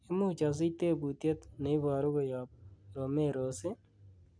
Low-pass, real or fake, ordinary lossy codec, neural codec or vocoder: 9.9 kHz; real; none; none